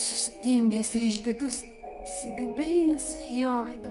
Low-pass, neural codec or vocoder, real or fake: 10.8 kHz; codec, 24 kHz, 0.9 kbps, WavTokenizer, medium music audio release; fake